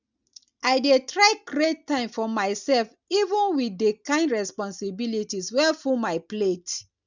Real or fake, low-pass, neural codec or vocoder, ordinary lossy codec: real; 7.2 kHz; none; none